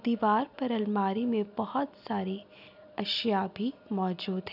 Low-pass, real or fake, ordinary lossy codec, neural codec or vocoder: 5.4 kHz; real; none; none